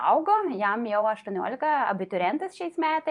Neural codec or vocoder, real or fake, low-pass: vocoder, 24 kHz, 100 mel bands, Vocos; fake; 10.8 kHz